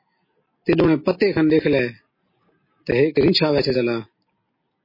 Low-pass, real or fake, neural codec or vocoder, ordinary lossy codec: 5.4 kHz; real; none; MP3, 24 kbps